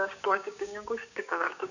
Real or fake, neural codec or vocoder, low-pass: fake; codec, 16 kHz, 6 kbps, DAC; 7.2 kHz